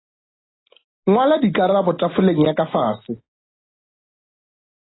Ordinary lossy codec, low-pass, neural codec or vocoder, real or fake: AAC, 16 kbps; 7.2 kHz; none; real